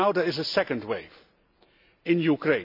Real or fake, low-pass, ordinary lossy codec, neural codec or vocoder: real; 5.4 kHz; none; none